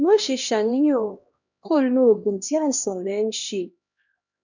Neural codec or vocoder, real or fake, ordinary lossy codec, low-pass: codec, 16 kHz, 1 kbps, X-Codec, HuBERT features, trained on LibriSpeech; fake; none; 7.2 kHz